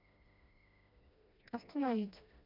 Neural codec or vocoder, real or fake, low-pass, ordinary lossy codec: codec, 16 kHz, 1 kbps, FreqCodec, smaller model; fake; 5.4 kHz; MP3, 48 kbps